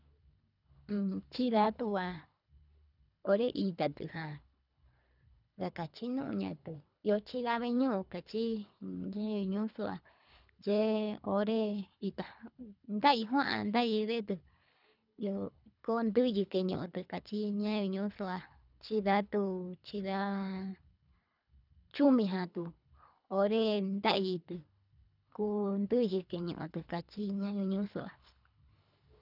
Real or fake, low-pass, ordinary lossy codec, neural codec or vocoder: fake; 5.4 kHz; none; codec, 24 kHz, 3 kbps, HILCodec